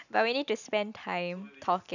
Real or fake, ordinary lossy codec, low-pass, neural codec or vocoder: real; none; 7.2 kHz; none